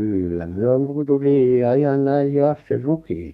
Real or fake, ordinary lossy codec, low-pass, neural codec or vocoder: fake; none; 14.4 kHz; codec, 32 kHz, 1.9 kbps, SNAC